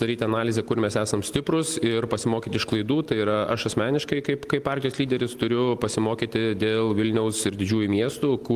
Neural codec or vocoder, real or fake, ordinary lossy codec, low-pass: none; real; Opus, 24 kbps; 14.4 kHz